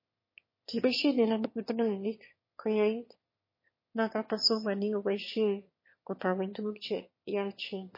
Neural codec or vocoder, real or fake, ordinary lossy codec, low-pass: autoencoder, 22.05 kHz, a latent of 192 numbers a frame, VITS, trained on one speaker; fake; MP3, 24 kbps; 5.4 kHz